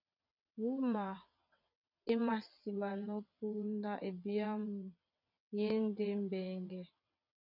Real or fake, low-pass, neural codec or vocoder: fake; 5.4 kHz; vocoder, 22.05 kHz, 80 mel bands, WaveNeXt